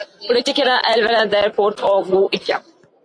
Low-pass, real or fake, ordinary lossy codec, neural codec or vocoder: 9.9 kHz; real; AAC, 48 kbps; none